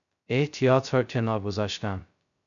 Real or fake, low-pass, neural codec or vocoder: fake; 7.2 kHz; codec, 16 kHz, 0.2 kbps, FocalCodec